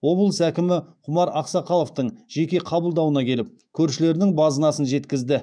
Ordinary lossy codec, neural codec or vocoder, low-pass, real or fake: none; autoencoder, 48 kHz, 128 numbers a frame, DAC-VAE, trained on Japanese speech; 9.9 kHz; fake